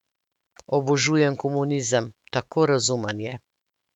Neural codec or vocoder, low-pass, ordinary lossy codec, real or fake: autoencoder, 48 kHz, 128 numbers a frame, DAC-VAE, trained on Japanese speech; 19.8 kHz; none; fake